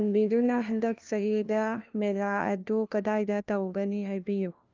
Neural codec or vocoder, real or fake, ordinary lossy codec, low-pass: codec, 16 kHz, 1 kbps, FunCodec, trained on LibriTTS, 50 frames a second; fake; Opus, 24 kbps; 7.2 kHz